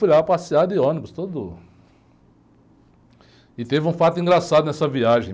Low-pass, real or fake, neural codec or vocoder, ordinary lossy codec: none; real; none; none